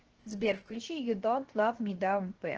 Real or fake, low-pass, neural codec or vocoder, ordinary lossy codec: fake; 7.2 kHz; codec, 16 kHz, 0.7 kbps, FocalCodec; Opus, 16 kbps